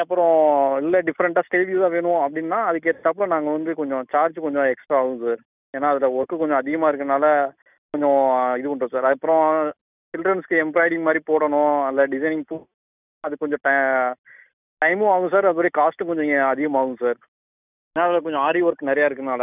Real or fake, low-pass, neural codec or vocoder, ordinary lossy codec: real; 3.6 kHz; none; none